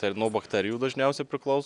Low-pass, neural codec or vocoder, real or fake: 10.8 kHz; none; real